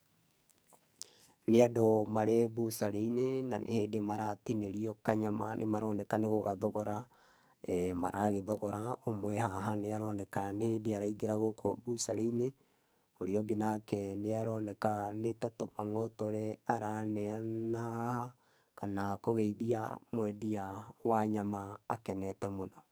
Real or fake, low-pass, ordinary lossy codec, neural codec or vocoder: fake; none; none; codec, 44.1 kHz, 2.6 kbps, SNAC